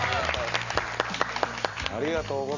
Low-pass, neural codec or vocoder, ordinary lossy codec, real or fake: 7.2 kHz; none; Opus, 64 kbps; real